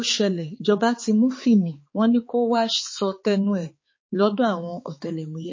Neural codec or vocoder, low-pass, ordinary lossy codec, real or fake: codec, 16 kHz, 4 kbps, X-Codec, HuBERT features, trained on balanced general audio; 7.2 kHz; MP3, 32 kbps; fake